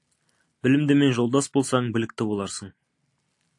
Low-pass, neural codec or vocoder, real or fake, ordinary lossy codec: 10.8 kHz; none; real; AAC, 64 kbps